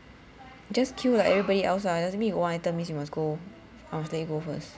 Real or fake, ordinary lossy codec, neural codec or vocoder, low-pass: real; none; none; none